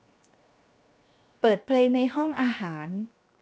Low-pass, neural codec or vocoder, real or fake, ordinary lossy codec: none; codec, 16 kHz, 0.7 kbps, FocalCodec; fake; none